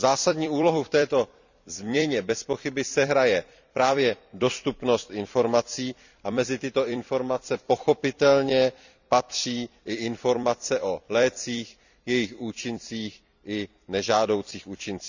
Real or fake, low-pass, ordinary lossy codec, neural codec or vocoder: fake; 7.2 kHz; none; vocoder, 44.1 kHz, 128 mel bands every 256 samples, BigVGAN v2